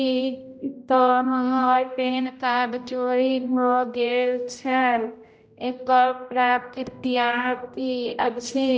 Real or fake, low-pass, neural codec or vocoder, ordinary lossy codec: fake; none; codec, 16 kHz, 0.5 kbps, X-Codec, HuBERT features, trained on general audio; none